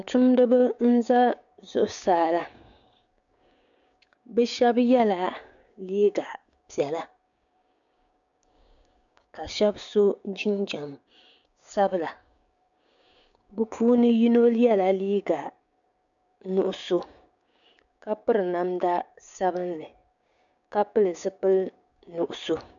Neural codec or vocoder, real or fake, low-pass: codec, 16 kHz, 6 kbps, DAC; fake; 7.2 kHz